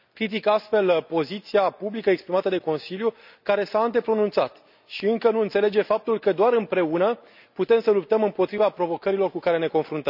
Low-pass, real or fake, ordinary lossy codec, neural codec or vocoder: 5.4 kHz; real; none; none